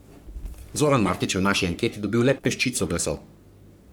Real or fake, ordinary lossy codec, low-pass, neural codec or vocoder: fake; none; none; codec, 44.1 kHz, 3.4 kbps, Pupu-Codec